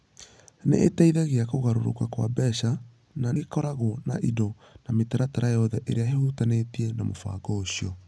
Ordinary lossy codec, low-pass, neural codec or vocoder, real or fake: none; none; none; real